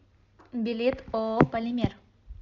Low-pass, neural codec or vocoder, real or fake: 7.2 kHz; none; real